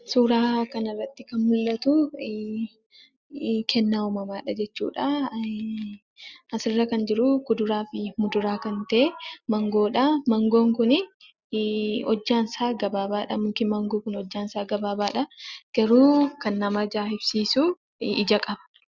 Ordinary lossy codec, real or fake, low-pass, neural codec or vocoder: Opus, 64 kbps; real; 7.2 kHz; none